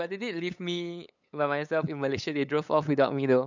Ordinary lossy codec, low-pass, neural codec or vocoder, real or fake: none; 7.2 kHz; codec, 16 kHz, 8 kbps, FunCodec, trained on LibriTTS, 25 frames a second; fake